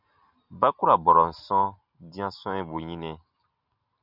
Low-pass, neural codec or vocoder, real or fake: 5.4 kHz; none; real